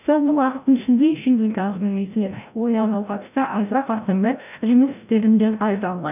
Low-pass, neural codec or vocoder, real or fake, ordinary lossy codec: 3.6 kHz; codec, 16 kHz, 0.5 kbps, FreqCodec, larger model; fake; none